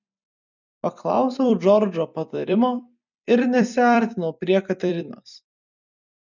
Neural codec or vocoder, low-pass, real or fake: vocoder, 44.1 kHz, 128 mel bands every 256 samples, BigVGAN v2; 7.2 kHz; fake